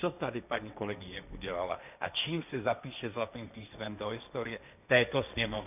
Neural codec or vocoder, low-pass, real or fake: codec, 16 kHz, 1.1 kbps, Voila-Tokenizer; 3.6 kHz; fake